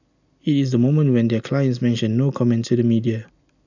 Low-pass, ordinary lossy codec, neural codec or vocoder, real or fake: 7.2 kHz; none; none; real